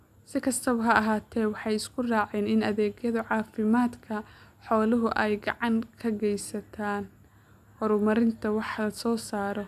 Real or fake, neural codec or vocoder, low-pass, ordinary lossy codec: real; none; 14.4 kHz; none